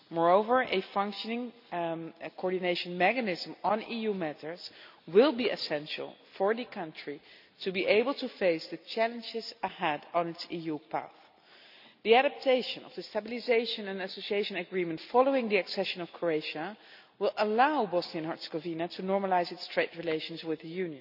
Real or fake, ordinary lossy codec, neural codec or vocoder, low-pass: real; none; none; 5.4 kHz